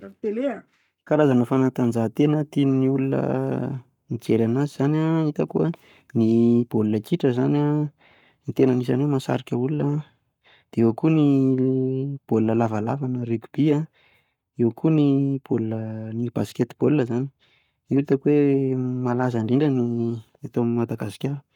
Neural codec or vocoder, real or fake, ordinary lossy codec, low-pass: codec, 44.1 kHz, 7.8 kbps, Pupu-Codec; fake; none; 19.8 kHz